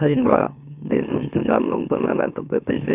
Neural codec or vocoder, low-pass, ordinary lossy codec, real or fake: autoencoder, 44.1 kHz, a latent of 192 numbers a frame, MeloTTS; 3.6 kHz; none; fake